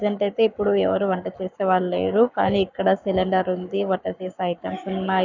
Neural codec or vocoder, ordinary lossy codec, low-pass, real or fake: codec, 44.1 kHz, 7.8 kbps, Pupu-Codec; none; 7.2 kHz; fake